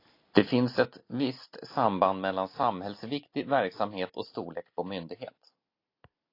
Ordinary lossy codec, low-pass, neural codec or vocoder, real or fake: AAC, 32 kbps; 5.4 kHz; none; real